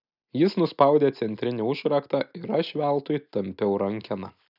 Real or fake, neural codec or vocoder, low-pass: real; none; 5.4 kHz